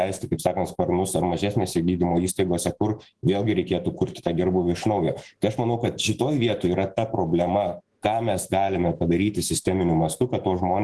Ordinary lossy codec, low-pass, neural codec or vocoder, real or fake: Opus, 16 kbps; 10.8 kHz; autoencoder, 48 kHz, 128 numbers a frame, DAC-VAE, trained on Japanese speech; fake